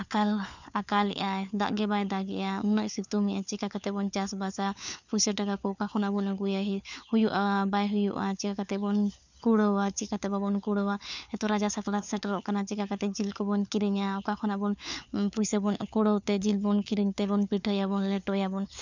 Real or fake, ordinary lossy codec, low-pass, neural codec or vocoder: fake; none; 7.2 kHz; codec, 16 kHz, 4 kbps, FunCodec, trained on LibriTTS, 50 frames a second